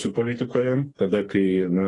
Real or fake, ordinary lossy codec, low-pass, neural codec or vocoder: fake; AAC, 32 kbps; 10.8 kHz; codec, 44.1 kHz, 2.6 kbps, SNAC